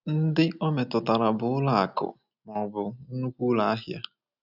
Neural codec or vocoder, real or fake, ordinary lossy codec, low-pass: none; real; none; 5.4 kHz